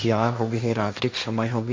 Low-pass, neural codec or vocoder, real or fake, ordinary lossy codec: none; codec, 16 kHz, 1.1 kbps, Voila-Tokenizer; fake; none